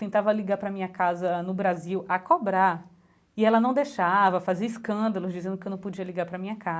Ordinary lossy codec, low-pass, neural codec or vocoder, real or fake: none; none; none; real